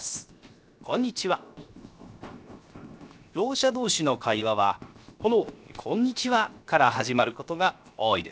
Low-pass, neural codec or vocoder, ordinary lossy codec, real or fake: none; codec, 16 kHz, 0.7 kbps, FocalCodec; none; fake